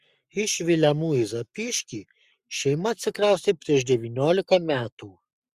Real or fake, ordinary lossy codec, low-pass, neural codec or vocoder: fake; Opus, 64 kbps; 14.4 kHz; codec, 44.1 kHz, 7.8 kbps, Pupu-Codec